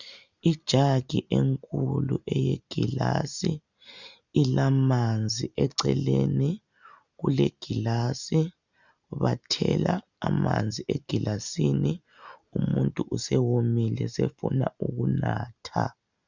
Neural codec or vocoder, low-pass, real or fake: none; 7.2 kHz; real